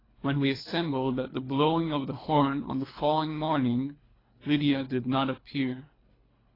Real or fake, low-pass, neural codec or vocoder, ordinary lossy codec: fake; 5.4 kHz; codec, 24 kHz, 3 kbps, HILCodec; AAC, 24 kbps